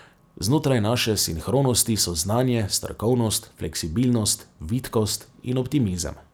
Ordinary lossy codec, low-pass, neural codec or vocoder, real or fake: none; none; none; real